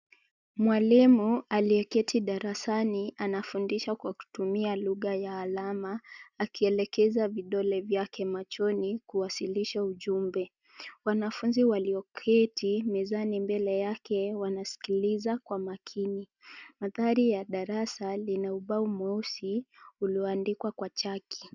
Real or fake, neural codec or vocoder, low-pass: real; none; 7.2 kHz